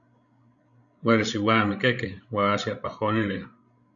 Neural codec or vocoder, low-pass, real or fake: codec, 16 kHz, 16 kbps, FreqCodec, larger model; 7.2 kHz; fake